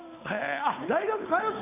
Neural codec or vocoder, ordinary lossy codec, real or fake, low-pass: codec, 16 kHz, 8 kbps, FunCodec, trained on Chinese and English, 25 frames a second; none; fake; 3.6 kHz